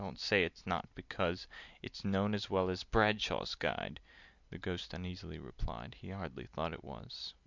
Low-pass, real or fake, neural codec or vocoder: 7.2 kHz; real; none